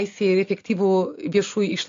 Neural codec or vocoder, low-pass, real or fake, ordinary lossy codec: none; 7.2 kHz; real; AAC, 48 kbps